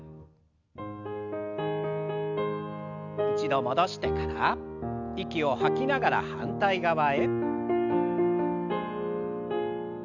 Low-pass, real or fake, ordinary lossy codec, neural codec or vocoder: 7.2 kHz; real; none; none